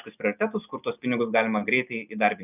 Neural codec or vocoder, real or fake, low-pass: none; real; 3.6 kHz